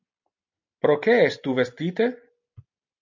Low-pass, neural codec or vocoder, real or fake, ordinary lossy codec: 7.2 kHz; none; real; MP3, 48 kbps